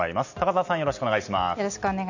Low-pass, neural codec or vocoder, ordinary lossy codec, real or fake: 7.2 kHz; none; none; real